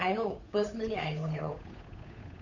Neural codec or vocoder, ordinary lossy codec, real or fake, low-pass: codec, 16 kHz, 8 kbps, FunCodec, trained on Chinese and English, 25 frames a second; none; fake; 7.2 kHz